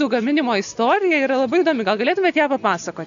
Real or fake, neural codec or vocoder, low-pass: real; none; 7.2 kHz